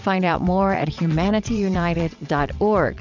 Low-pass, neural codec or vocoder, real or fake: 7.2 kHz; vocoder, 22.05 kHz, 80 mel bands, WaveNeXt; fake